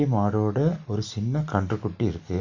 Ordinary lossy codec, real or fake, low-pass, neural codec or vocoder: none; real; 7.2 kHz; none